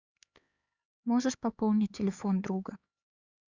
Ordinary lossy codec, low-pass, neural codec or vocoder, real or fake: Opus, 64 kbps; 7.2 kHz; codec, 16 kHz, 4 kbps, X-Codec, HuBERT features, trained on LibriSpeech; fake